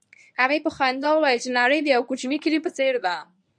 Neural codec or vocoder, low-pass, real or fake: codec, 24 kHz, 0.9 kbps, WavTokenizer, medium speech release version 1; 9.9 kHz; fake